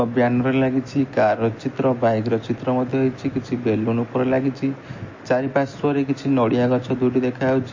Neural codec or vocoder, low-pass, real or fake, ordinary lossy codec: none; 7.2 kHz; real; MP3, 32 kbps